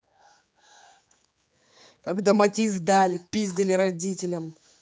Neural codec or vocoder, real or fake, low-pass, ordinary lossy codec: codec, 16 kHz, 4 kbps, X-Codec, HuBERT features, trained on general audio; fake; none; none